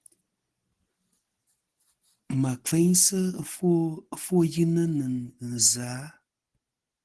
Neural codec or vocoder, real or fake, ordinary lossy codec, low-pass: none; real; Opus, 16 kbps; 10.8 kHz